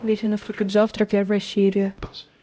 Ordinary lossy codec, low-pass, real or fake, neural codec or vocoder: none; none; fake; codec, 16 kHz, 0.5 kbps, X-Codec, HuBERT features, trained on LibriSpeech